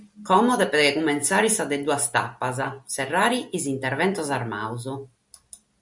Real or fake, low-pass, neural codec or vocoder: real; 10.8 kHz; none